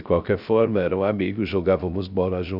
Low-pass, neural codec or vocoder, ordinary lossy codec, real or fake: 5.4 kHz; codec, 16 kHz, 0.3 kbps, FocalCodec; none; fake